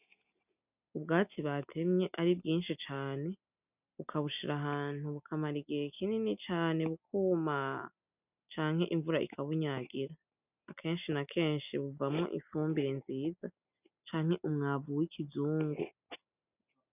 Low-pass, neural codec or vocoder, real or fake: 3.6 kHz; none; real